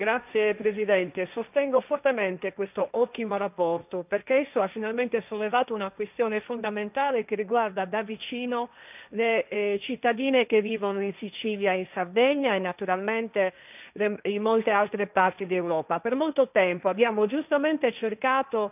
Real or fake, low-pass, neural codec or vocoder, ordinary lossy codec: fake; 3.6 kHz; codec, 16 kHz, 1.1 kbps, Voila-Tokenizer; none